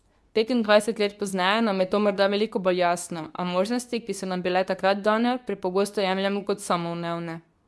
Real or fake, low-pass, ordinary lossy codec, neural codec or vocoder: fake; none; none; codec, 24 kHz, 0.9 kbps, WavTokenizer, medium speech release version 2